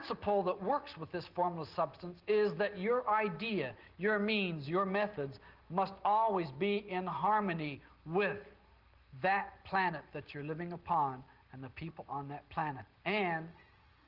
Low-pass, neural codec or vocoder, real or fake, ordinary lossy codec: 5.4 kHz; none; real; Opus, 16 kbps